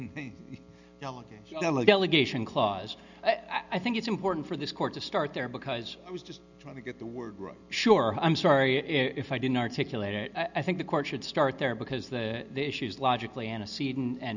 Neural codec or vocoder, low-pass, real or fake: none; 7.2 kHz; real